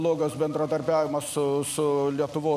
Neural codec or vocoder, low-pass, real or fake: none; 14.4 kHz; real